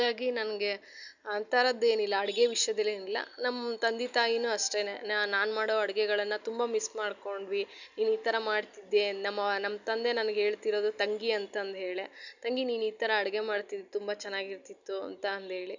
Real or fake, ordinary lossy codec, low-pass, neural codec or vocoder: real; none; 7.2 kHz; none